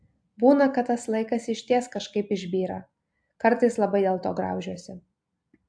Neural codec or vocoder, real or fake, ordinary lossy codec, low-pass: none; real; AAC, 64 kbps; 9.9 kHz